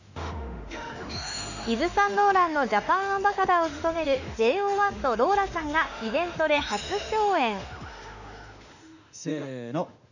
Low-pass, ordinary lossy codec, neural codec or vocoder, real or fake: 7.2 kHz; none; autoencoder, 48 kHz, 32 numbers a frame, DAC-VAE, trained on Japanese speech; fake